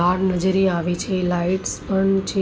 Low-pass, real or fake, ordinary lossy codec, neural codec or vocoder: none; real; none; none